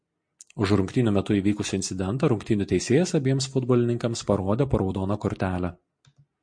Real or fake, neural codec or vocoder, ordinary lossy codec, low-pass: real; none; MP3, 48 kbps; 9.9 kHz